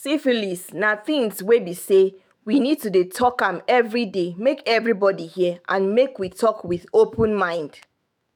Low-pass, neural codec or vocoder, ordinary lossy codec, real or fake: none; autoencoder, 48 kHz, 128 numbers a frame, DAC-VAE, trained on Japanese speech; none; fake